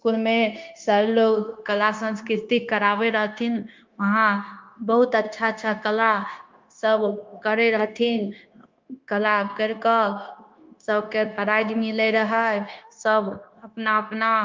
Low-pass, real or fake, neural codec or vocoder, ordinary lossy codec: 7.2 kHz; fake; codec, 16 kHz, 0.9 kbps, LongCat-Audio-Codec; Opus, 24 kbps